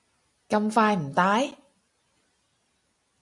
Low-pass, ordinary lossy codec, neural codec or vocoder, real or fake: 10.8 kHz; MP3, 96 kbps; none; real